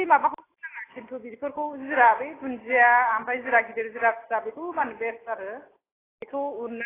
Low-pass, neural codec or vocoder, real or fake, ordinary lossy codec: 3.6 kHz; none; real; AAC, 16 kbps